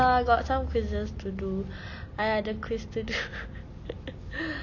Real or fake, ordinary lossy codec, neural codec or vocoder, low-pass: real; none; none; 7.2 kHz